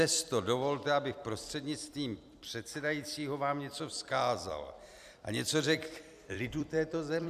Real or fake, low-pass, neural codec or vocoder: fake; 14.4 kHz; vocoder, 44.1 kHz, 128 mel bands every 256 samples, BigVGAN v2